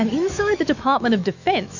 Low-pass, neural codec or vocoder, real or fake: 7.2 kHz; autoencoder, 48 kHz, 128 numbers a frame, DAC-VAE, trained on Japanese speech; fake